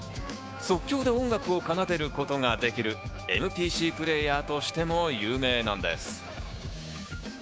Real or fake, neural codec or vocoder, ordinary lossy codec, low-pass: fake; codec, 16 kHz, 6 kbps, DAC; none; none